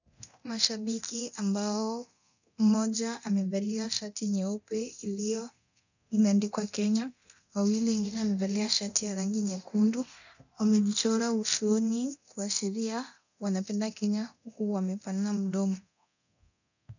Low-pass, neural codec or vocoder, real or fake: 7.2 kHz; codec, 24 kHz, 0.9 kbps, DualCodec; fake